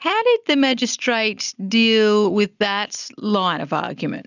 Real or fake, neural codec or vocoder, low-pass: real; none; 7.2 kHz